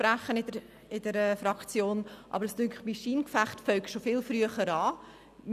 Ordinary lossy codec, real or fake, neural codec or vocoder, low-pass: none; real; none; 14.4 kHz